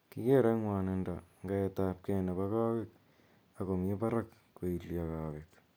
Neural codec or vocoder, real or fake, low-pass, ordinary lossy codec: none; real; none; none